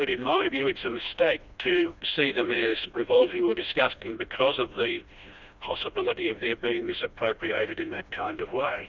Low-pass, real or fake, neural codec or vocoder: 7.2 kHz; fake; codec, 16 kHz, 1 kbps, FreqCodec, smaller model